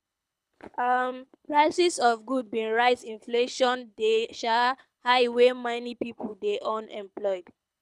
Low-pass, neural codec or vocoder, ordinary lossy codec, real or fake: none; codec, 24 kHz, 6 kbps, HILCodec; none; fake